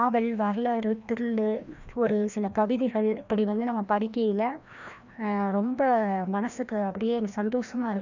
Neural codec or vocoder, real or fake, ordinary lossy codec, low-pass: codec, 16 kHz, 1 kbps, FreqCodec, larger model; fake; none; 7.2 kHz